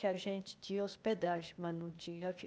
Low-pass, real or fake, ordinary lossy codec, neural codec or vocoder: none; fake; none; codec, 16 kHz, 0.8 kbps, ZipCodec